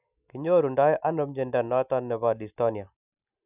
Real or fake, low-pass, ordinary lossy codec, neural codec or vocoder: real; 3.6 kHz; none; none